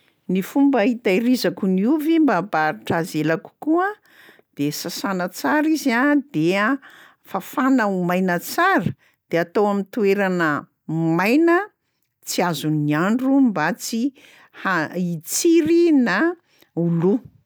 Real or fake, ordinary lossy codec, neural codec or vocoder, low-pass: real; none; none; none